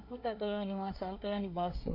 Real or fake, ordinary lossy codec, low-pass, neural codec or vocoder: fake; none; 5.4 kHz; codec, 24 kHz, 1 kbps, SNAC